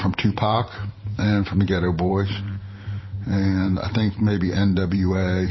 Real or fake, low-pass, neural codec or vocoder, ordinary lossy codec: fake; 7.2 kHz; vocoder, 44.1 kHz, 128 mel bands every 512 samples, BigVGAN v2; MP3, 24 kbps